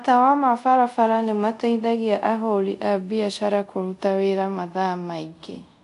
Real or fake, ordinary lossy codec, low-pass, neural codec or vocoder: fake; none; 10.8 kHz; codec, 24 kHz, 0.5 kbps, DualCodec